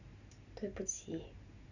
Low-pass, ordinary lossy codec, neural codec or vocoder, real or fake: 7.2 kHz; none; none; real